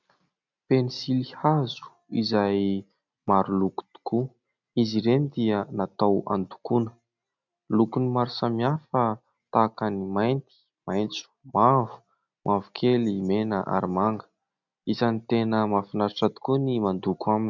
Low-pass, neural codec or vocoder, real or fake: 7.2 kHz; none; real